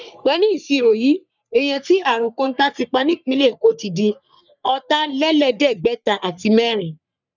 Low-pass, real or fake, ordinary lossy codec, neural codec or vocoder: 7.2 kHz; fake; none; codec, 44.1 kHz, 3.4 kbps, Pupu-Codec